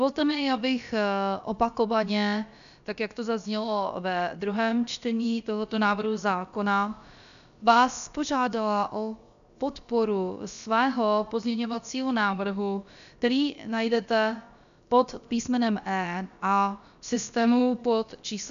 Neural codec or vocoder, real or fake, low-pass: codec, 16 kHz, about 1 kbps, DyCAST, with the encoder's durations; fake; 7.2 kHz